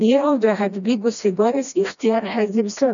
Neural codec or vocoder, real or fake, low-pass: codec, 16 kHz, 1 kbps, FreqCodec, smaller model; fake; 7.2 kHz